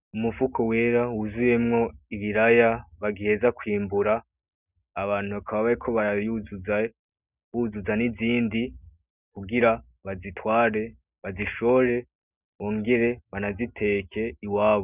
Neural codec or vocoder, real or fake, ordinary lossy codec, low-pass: none; real; Opus, 64 kbps; 3.6 kHz